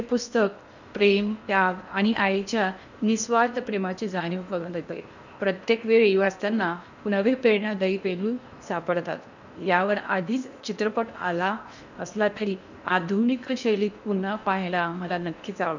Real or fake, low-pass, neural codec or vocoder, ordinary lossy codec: fake; 7.2 kHz; codec, 16 kHz in and 24 kHz out, 0.8 kbps, FocalCodec, streaming, 65536 codes; none